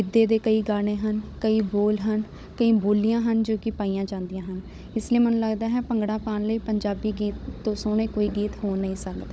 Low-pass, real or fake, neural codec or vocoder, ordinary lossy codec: none; fake; codec, 16 kHz, 16 kbps, FunCodec, trained on Chinese and English, 50 frames a second; none